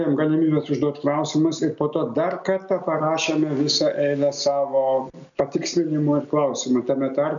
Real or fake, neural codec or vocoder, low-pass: real; none; 7.2 kHz